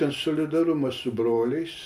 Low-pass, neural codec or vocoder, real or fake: 14.4 kHz; none; real